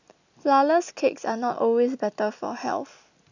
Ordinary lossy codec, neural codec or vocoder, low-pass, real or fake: none; none; 7.2 kHz; real